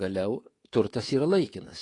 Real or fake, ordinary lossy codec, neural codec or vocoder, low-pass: fake; AAC, 32 kbps; codec, 24 kHz, 3.1 kbps, DualCodec; 10.8 kHz